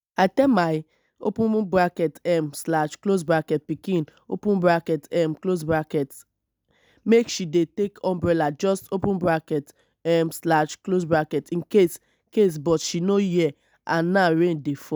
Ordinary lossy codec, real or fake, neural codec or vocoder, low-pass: none; real; none; none